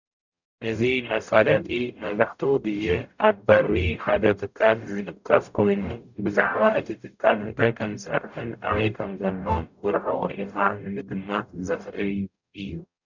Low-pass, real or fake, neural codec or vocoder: 7.2 kHz; fake; codec, 44.1 kHz, 0.9 kbps, DAC